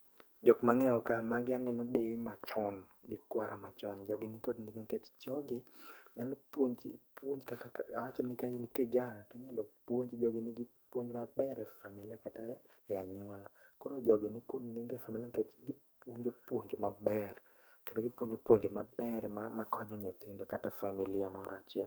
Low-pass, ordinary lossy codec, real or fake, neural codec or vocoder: none; none; fake; codec, 44.1 kHz, 2.6 kbps, SNAC